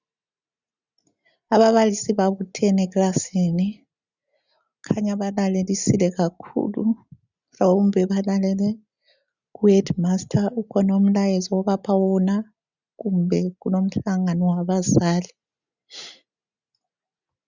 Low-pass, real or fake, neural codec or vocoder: 7.2 kHz; real; none